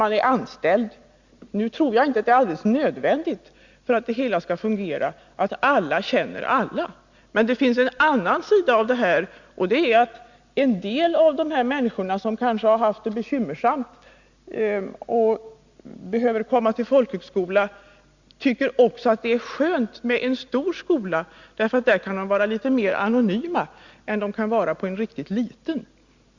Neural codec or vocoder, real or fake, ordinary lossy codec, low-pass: none; real; Opus, 64 kbps; 7.2 kHz